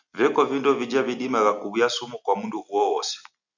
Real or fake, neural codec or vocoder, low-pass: real; none; 7.2 kHz